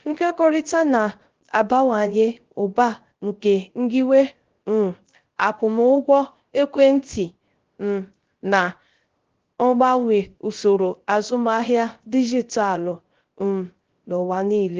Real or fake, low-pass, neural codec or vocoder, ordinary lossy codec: fake; 7.2 kHz; codec, 16 kHz, 0.3 kbps, FocalCodec; Opus, 16 kbps